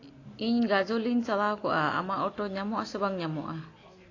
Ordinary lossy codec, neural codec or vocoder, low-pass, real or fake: AAC, 32 kbps; none; 7.2 kHz; real